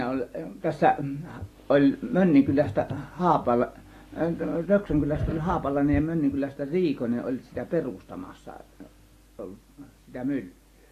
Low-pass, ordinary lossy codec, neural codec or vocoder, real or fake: 14.4 kHz; AAC, 48 kbps; none; real